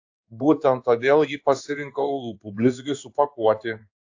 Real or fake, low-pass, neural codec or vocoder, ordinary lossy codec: fake; 7.2 kHz; codec, 16 kHz in and 24 kHz out, 1 kbps, XY-Tokenizer; AAC, 48 kbps